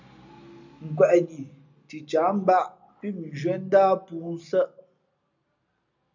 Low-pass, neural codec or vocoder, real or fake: 7.2 kHz; none; real